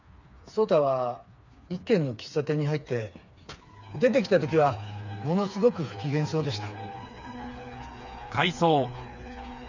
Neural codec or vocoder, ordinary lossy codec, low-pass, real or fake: codec, 16 kHz, 4 kbps, FreqCodec, smaller model; none; 7.2 kHz; fake